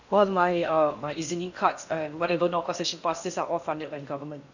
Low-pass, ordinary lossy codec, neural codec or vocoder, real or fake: 7.2 kHz; none; codec, 16 kHz in and 24 kHz out, 0.8 kbps, FocalCodec, streaming, 65536 codes; fake